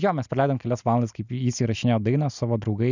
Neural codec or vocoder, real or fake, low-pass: none; real; 7.2 kHz